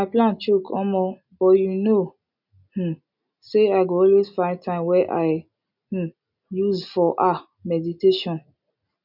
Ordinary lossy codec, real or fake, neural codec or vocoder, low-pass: none; real; none; 5.4 kHz